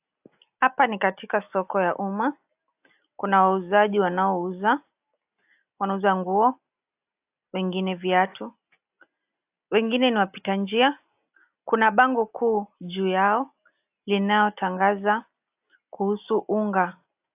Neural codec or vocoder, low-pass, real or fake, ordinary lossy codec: none; 3.6 kHz; real; AAC, 32 kbps